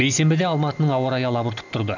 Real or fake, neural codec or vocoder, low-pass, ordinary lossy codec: real; none; 7.2 kHz; none